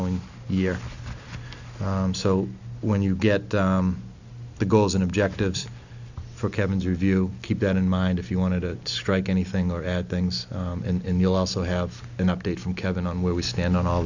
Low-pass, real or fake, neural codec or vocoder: 7.2 kHz; real; none